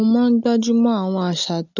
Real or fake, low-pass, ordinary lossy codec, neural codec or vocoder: real; 7.2 kHz; none; none